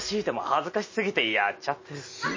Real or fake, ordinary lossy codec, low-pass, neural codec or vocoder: real; MP3, 32 kbps; 7.2 kHz; none